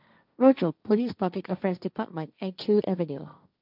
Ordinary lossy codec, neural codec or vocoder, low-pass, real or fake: none; codec, 16 kHz, 1.1 kbps, Voila-Tokenizer; 5.4 kHz; fake